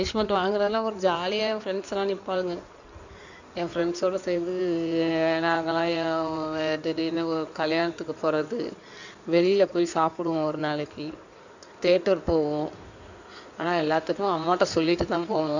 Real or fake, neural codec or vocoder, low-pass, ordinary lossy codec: fake; codec, 16 kHz in and 24 kHz out, 2.2 kbps, FireRedTTS-2 codec; 7.2 kHz; none